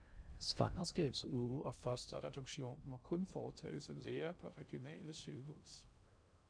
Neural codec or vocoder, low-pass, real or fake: codec, 16 kHz in and 24 kHz out, 0.6 kbps, FocalCodec, streaming, 2048 codes; 9.9 kHz; fake